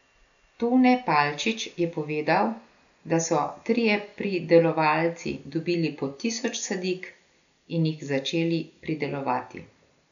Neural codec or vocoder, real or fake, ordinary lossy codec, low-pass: none; real; none; 7.2 kHz